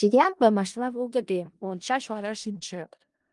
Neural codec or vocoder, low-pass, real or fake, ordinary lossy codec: codec, 16 kHz in and 24 kHz out, 0.4 kbps, LongCat-Audio-Codec, four codebook decoder; 10.8 kHz; fake; Opus, 32 kbps